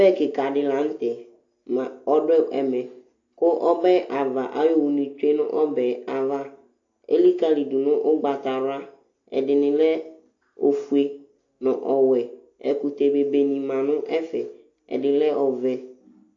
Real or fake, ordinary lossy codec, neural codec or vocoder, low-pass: real; AAC, 48 kbps; none; 7.2 kHz